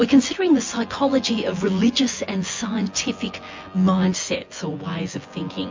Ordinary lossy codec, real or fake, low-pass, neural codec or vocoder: MP3, 48 kbps; fake; 7.2 kHz; vocoder, 24 kHz, 100 mel bands, Vocos